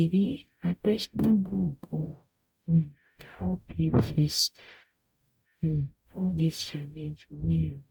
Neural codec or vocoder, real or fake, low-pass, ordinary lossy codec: codec, 44.1 kHz, 0.9 kbps, DAC; fake; 19.8 kHz; MP3, 96 kbps